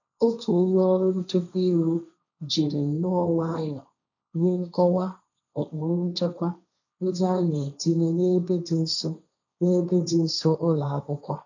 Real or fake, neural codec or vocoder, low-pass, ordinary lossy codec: fake; codec, 16 kHz, 1.1 kbps, Voila-Tokenizer; 7.2 kHz; none